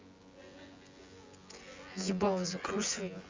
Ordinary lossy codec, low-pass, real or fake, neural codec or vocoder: Opus, 32 kbps; 7.2 kHz; fake; vocoder, 24 kHz, 100 mel bands, Vocos